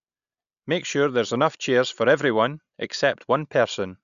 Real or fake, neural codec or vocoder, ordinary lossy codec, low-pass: real; none; none; 7.2 kHz